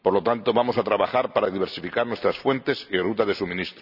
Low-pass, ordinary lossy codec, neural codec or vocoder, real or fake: 5.4 kHz; none; none; real